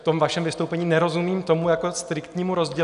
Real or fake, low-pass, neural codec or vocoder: real; 10.8 kHz; none